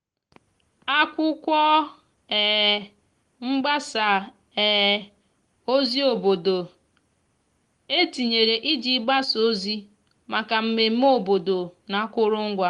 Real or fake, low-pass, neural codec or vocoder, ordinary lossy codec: real; 10.8 kHz; none; Opus, 24 kbps